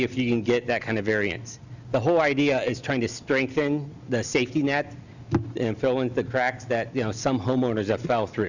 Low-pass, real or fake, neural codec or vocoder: 7.2 kHz; real; none